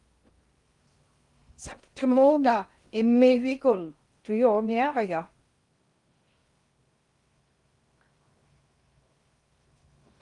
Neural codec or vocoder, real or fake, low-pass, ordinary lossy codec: codec, 16 kHz in and 24 kHz out, 0.6 kbps, FocalCodec, streaming, 2048 codes; fake; 10.8 kHz; Opus, 32 kbps